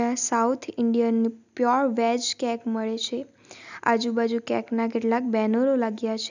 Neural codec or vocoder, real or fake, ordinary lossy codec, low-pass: none; real; none; 7.2 kHz